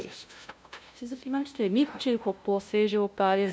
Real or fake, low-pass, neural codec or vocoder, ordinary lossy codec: fake; none; codec, 16 kHz, 0.5 kbps, FunCodec, trained on LibriTTS, 25 frames a second; none